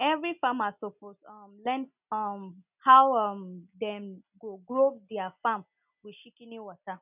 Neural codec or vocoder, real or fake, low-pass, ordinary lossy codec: none; real; 3.6 kHz; none